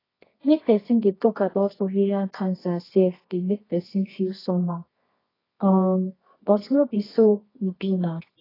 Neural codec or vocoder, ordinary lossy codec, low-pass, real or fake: codec, 24 kHz, 0.9 kbps, WavTokenizer, medium music audio release; AAC, 24 kbps; 5.4 kHz; fake